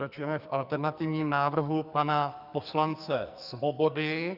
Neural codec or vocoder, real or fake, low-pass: codec, 44.1 kHz, 2.6 kbps, SNAC; fake; 5.4 kHz